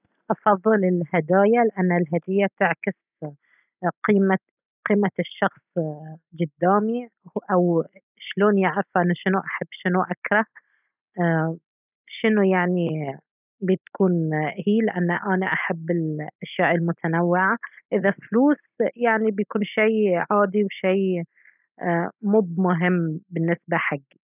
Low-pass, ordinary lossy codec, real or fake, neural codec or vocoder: 3.6 kHz; none; real; none